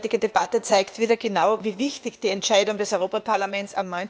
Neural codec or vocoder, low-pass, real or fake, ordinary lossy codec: codec, 16 kHz, 0.8 kbps, ZipCodec; none; fake; none